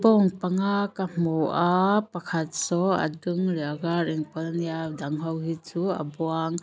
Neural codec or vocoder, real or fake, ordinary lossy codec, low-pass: none; real; none; none